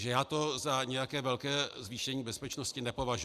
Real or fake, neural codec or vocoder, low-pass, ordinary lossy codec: real; none; 14.4 kHz; Opus, 64 kbps